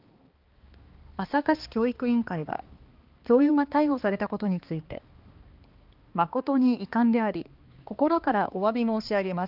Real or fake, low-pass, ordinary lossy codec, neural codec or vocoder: fake; 5.4 kHz; Opus, 32 kbps; codec, 16 kHz, 2 kbps, X-Codec, HuBERT features, trained on balanced general audio